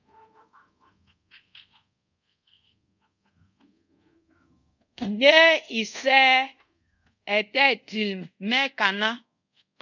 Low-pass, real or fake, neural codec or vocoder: 7.2 kHz; fake; codec, 24 kHz, 0.5 kbps, DualCodec